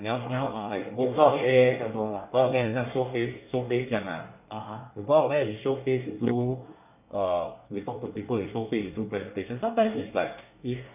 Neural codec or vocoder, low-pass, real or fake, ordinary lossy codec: codec, 24 kHz, 1 kbps, SNAC; 3.6 kHz; fake; none